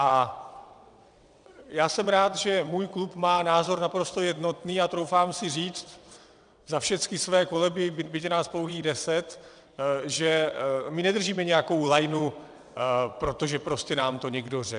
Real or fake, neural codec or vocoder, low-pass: fake; vocoder, 22.05 kHz, 80 mel bands, WaveNeXt; 9.9 kHz